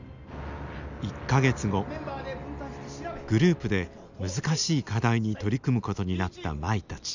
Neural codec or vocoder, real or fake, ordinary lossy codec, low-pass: none; real; none; 7.2 kHz